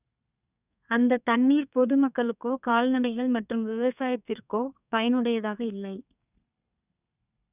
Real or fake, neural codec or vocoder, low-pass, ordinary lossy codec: fake; codec, 32 kHz, 1.9 kbps, SNAC; 3.6 kHz; none